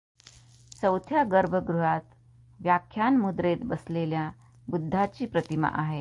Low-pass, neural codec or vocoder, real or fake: 10.8 kHz; none; real